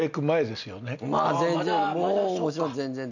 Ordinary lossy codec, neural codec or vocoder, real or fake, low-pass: none; none; real; 7.2 kHz